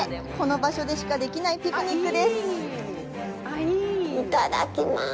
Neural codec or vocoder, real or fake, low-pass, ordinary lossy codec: none; real; none; none